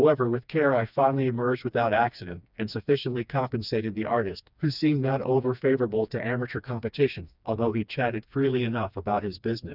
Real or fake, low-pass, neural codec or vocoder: fake; 5.4 kHz; codec, 16 kHz, 2 kbps, FreqCodec, smaller model